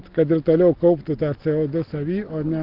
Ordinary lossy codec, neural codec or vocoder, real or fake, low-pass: Opus, 16 kbps; none; real; 5.4 kHz